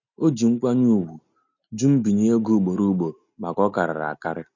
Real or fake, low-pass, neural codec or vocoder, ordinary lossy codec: real; 7.2 kHz; none; none